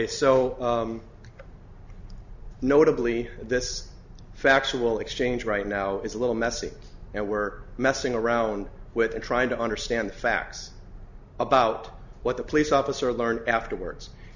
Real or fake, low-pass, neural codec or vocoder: real; 7.2 kHz; none